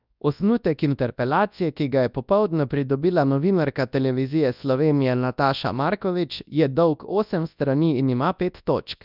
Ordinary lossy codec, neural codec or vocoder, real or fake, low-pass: none; codec, 24 kHz, 0.9 kbps, WavTokenizer, large speech release; fake; 5.4 kHz